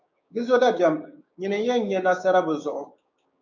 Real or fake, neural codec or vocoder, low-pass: fake; codec, 16 kHz, 6 kbps, DAC; 7.2 kHz